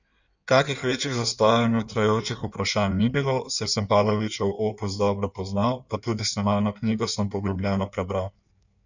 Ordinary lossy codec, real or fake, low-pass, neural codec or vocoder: none; fake; 7.2 kHz; codec, 16 kHz in and 24 kHz out, 1.1 kbps, FireRedTTS-2 codec